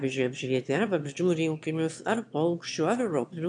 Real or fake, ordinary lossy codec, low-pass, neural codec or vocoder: fake; AAC, 64 kbps; 9.9 kHz; autoencoder, 22.05 kHz, a latent of 192 numbers a frame, VITS, trained on one speaker